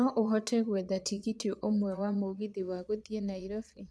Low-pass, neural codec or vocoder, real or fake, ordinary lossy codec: none; vocoder, 22.05 kHz, 80 mel bands, Vocos; fake; none